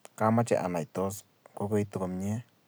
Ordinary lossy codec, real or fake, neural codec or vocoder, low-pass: none; real; none; none